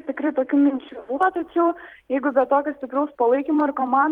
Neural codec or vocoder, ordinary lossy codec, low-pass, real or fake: vocoder, 44.1 kHz, 128 mel bands every 256 samples, BigVGAN v2; Opus, 24 kbps; 19.8 kHz; fake